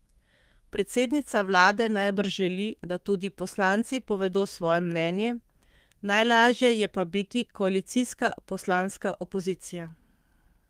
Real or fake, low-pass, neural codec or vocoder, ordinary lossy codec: fake; 14.4 kHz; codec, 32 kHz, 1.9 kbps, SNAC; Opus, 32 kbps